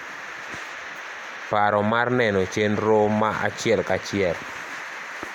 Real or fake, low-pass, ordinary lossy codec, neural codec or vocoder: real; 19.8 kHz; none; none